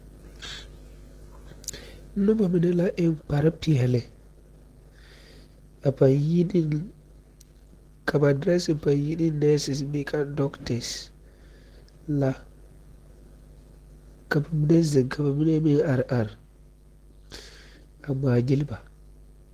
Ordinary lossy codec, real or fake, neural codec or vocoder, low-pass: Opus, 16 kbps; real; none; 14.4 kHz